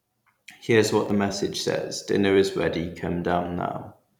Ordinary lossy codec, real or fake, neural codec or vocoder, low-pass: none; fake; vocoder, 44.1 kHz, 128 mel bands every 256 samples, BigVGAN v2; 19.8 kHz